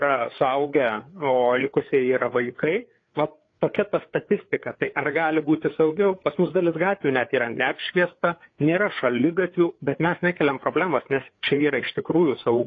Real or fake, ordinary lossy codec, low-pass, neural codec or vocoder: fake; AAC, 32 kbps; 7.2 kHz; codec, 16 kHz, 4 kbps, FreqCodec, larger model